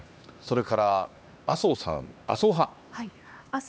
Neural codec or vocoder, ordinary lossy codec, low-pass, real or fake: codec, 16 kHz, 2 kbps, X-Codec, HuBERT features, trained on LibriSpeech; none; none; fake